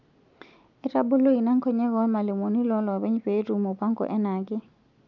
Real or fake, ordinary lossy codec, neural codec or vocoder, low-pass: real; none; none; 7.2 kHz